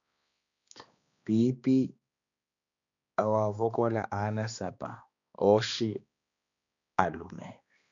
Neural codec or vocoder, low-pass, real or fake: codec, 16 kHz, 2 kbps, X-Codec, HuBERT features, trained on balanced general audio; 7.2 kHz; fake